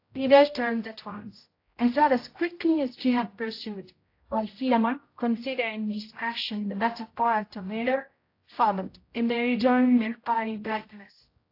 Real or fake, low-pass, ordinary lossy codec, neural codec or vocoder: fake; 5.4 kHz; AAC, 32 kbps; codec, 16 kHz, 0.5 kbps, X-Codec, HuBERT features, trained on general audio